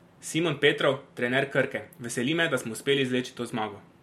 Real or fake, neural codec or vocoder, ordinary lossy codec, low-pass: real; none; MP3, 64 kbps; 19.8 kHz